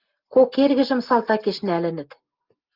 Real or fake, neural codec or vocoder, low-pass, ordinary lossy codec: real; none; 5.4 kHz; Opus, 24 kbps